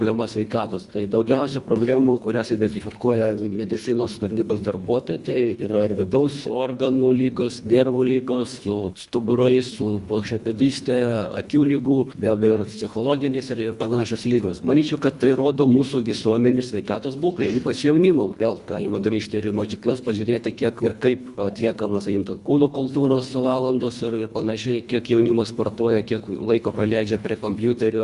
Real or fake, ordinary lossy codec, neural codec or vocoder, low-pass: fake; Opus, 64 kbps; codec, 24 kHz, 1.5 kbps, HILCodec; 10.8 kHz